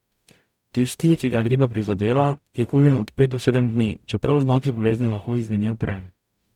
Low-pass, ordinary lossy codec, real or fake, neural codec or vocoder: 19.8 kHz; none; fake; codec, 44.1 kHz, 0.9 kbps, DAC